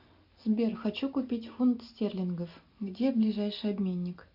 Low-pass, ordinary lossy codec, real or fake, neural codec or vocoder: 5.4 kHz; AAC, 48 kbps; real; none